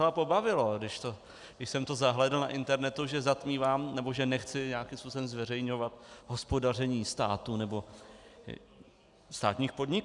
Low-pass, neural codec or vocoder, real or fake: 10.8 kHz; none; real